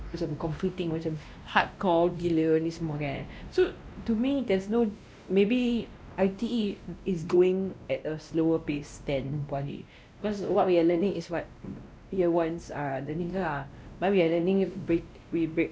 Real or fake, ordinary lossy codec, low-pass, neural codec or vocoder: fake; none; none; codec, 16 kHz, 1 kbps, X-Codec, WavLM features, trained on Multilingual LibriSpeech